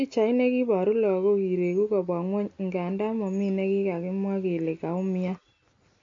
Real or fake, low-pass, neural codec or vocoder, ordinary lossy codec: real; 7.2 kHz; none; AAC, 48 kbps